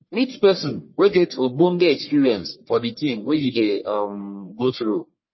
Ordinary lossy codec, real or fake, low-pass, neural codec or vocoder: MP3, 24 kbps; fake; 7.2 kHz; codec, 44.1 kHz, 1.7 kbps, Pupu-Codec